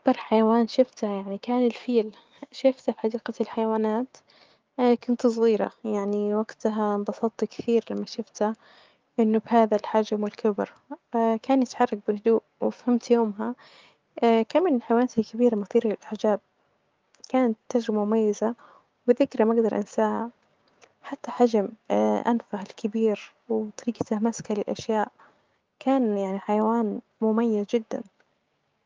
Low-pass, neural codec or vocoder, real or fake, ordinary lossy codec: 7.2 kHz; codec, 16 kHz, 6 kbps, DAC; fake; Opus, 24 kbps